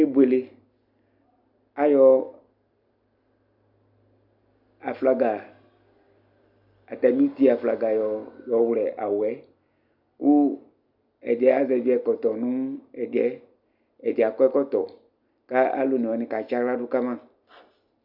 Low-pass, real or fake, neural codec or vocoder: 5.4 kHz; real; none